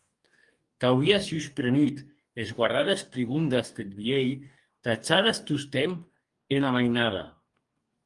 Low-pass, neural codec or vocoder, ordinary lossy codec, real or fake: 10.8 kHz; codec, 44.1 kHz, 2.6 kbps, DAC; Opus, 32 kbps; fake